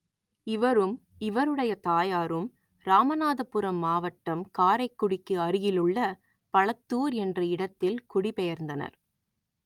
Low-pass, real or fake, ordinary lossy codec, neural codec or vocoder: 19.8 kHz; real; Opus, 32 kbps; none